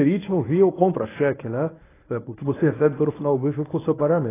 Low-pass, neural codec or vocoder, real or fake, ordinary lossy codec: 3.6 kHz; codec, 24 kHz, 0.9 kbps, WavTokenizer, medium speech release version 1; fake; AAC, 16 kbps